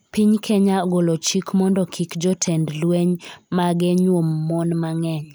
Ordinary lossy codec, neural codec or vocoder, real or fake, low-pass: none; none; real; none